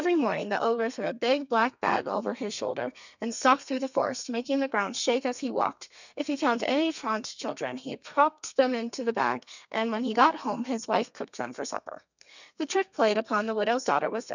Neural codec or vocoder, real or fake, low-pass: codec, 32 kHz, 1.9 kbps, SNAC; fake; 7.2 kHz